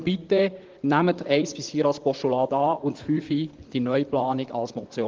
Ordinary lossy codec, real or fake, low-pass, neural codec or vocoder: Opus, 16 kbps; fake; 7.2 kHz; vocoder, 44.1 kHz, 128 mel bands, Pupu-Vocoder